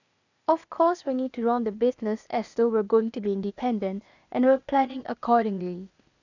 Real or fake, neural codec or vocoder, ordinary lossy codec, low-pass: fake; codec, 16 kHz, 0.8 kbps, ZipCodec; none; 7.2 kHz